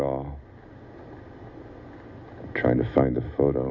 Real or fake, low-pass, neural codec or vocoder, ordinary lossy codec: real; 7.2 kHz; none; Opus, 64 kbps